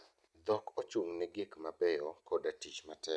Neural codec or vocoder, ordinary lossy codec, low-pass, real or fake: none; none; none; real